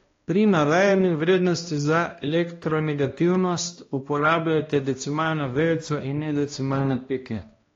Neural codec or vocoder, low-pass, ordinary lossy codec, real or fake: codec, 16 kHz, 1 kbps, X-Codec, HuBERT features, trained on balanced general audio; 7.2 kHz; AAC, 32 kbps; fake